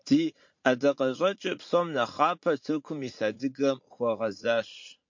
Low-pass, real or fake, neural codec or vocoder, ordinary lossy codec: 7.2 kHz; fake; vocoder, 44.1 kHz, 80 mel bands, Vocos; MP3, 48 kbps